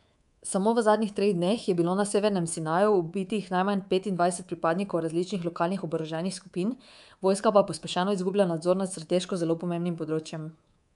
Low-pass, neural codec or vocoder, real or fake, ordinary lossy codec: 10.8 kHz; codec, 24 kHz, 3.1 kbps, DualCodec; fake; none